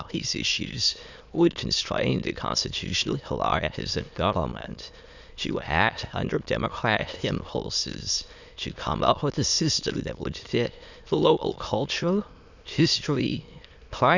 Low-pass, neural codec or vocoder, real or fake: 7.2 kHz; autoencoder, 22.05 kHz, a latent of 192 numbers a frame, VITS, trained on many speakers; fake